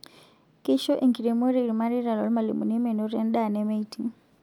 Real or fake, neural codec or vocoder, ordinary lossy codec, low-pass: real; none; none; 19.8 kHz